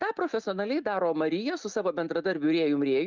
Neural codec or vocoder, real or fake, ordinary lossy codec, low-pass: none; real; Opus, 32 kbps; 7.2 kHz